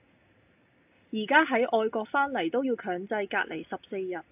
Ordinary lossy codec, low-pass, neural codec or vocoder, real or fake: AAC, 32 kbps; 3.6 kHz; none; real